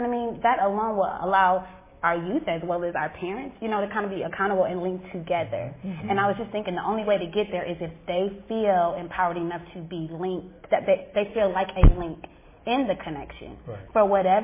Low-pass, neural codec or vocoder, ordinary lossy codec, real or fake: 3.6 kHz; none; MP3, 16 kbps; real